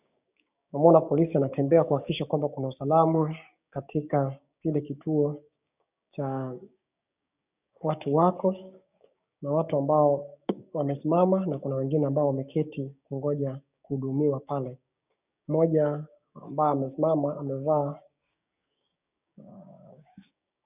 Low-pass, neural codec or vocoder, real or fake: 3.6 kHz; codec, 16 kHz, 6 kbps, DAC; fake